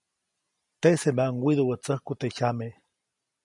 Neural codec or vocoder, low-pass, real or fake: none; 10.8 kHz; real